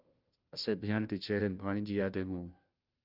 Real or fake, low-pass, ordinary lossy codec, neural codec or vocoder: fake; 5.4 kHz; Opus, 32 kbps; codec, 16 kHz, 0.5 kbps, FunCodec, trained on Chinese and English, 25 frames a second